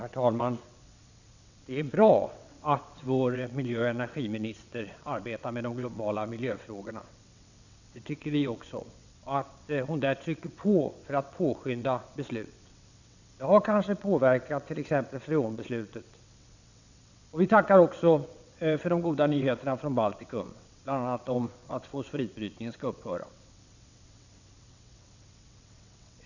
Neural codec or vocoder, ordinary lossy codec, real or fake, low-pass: vocoder, 22.05 kHz, 80 mel bands, WaveNeXt; none; fake; 7.2 kHz